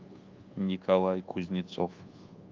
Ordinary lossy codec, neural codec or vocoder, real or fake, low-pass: Opus, 32 kbps; codec, 24 kHz, 1.2 kbps, DualCodec; fake; 7.2 kHz